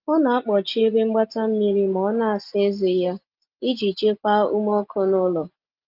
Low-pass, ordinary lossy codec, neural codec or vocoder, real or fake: 5.4 kHz; Opus, 24 kbps; none; real